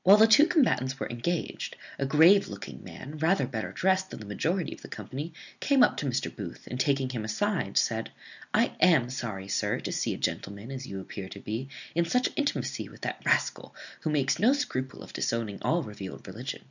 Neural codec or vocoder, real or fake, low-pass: none; real; 7.2 kHz